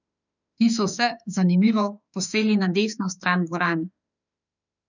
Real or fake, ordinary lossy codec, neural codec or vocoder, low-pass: fake; none; autoencoder, 48 kHz, 32 numbers a frame, DAC-VAE, trained on Japanese speech; 7.2 kHz